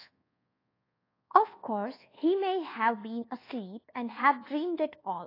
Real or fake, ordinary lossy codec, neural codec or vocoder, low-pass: fake; AAC, 24 kbps; codec, 24 kHz, 1.2 kbps, DualCodec; 5.4 kHz